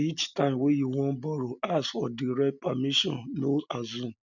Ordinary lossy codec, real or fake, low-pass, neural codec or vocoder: none; real; 7.2 kHz; none